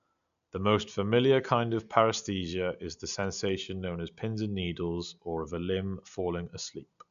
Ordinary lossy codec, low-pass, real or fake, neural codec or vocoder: none; 7.2 kHz; real; none